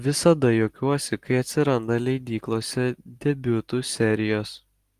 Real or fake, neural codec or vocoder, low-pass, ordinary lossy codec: real; none; 14.4 kHz; Opus, 24 kbps